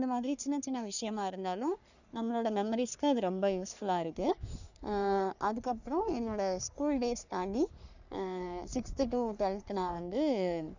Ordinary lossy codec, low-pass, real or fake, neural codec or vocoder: none; 7.2 kHz; fake; codec, 44.1 kHz, 3.4 kbps, Pupu-Codec